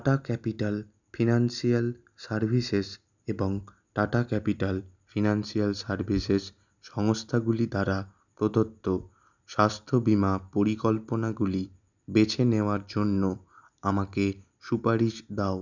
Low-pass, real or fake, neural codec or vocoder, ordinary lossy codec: 7.2 kHz; real; none; none